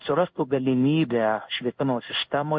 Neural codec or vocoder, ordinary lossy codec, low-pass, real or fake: codec, 16 kHz in and 24 kHz out, 1 kbps, XY-Tokenizer; MP3, 48 kbps; 7.2 kHz; fake